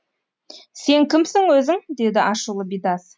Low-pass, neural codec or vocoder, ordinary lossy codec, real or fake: none; none; none; real